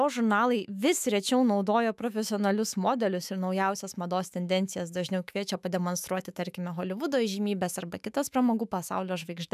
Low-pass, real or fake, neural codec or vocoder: 14.4 kHz; fake; autoencoder, 48 kHz, 128 numbers a frame, DAC-VAE, trained on Japanese speech